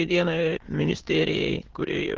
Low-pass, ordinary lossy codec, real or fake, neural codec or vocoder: 7.2 kHz; Opus, 16 kbps; fake; autoencoder, 22.05 kHz, a latent of 192 numbers a frame, VITS, trained on many speakers